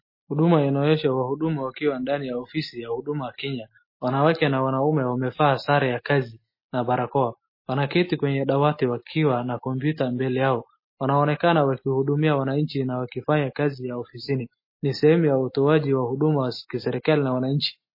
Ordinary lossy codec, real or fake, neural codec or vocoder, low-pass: MP3, 24 kbps; real; none; 5.4 kHz